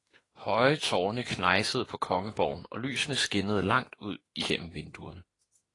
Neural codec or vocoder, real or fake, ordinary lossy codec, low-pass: autoencoder, 48 kHz, 32 numbers a frame, DAC-VAE, trained on Japanese speech; fake; AAC, 32 kbps; 10.8 kHz